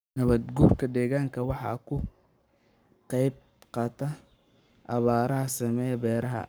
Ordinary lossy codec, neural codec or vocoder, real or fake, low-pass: none; codec, 44.1 kHz, 7.8 kbps, Pupu-Codec; fake; none